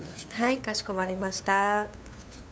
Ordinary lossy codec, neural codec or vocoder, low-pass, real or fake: none; codec, 16 kHz, 2 kbps, FunCodec, trained on LibriTTS, 25 frames a second; none; fake